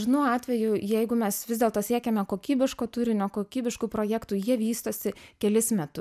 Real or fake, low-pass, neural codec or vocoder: real; 14.4 kHz; none